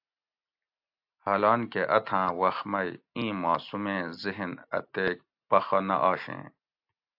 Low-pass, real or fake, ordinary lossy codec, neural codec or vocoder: 5.4 kHz; real; AAC, 48 kbps; none